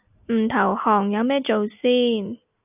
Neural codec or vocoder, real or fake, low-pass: none; real; 3.6 kHz